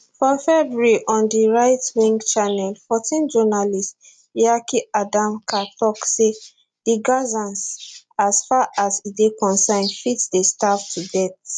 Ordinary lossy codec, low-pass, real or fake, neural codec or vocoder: none; 9.9 kHz; real; none